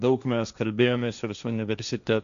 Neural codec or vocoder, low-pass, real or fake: codec, 16 kHz, 1.1 kbps, Voila-Tokenizer; 7.2 kHz; fake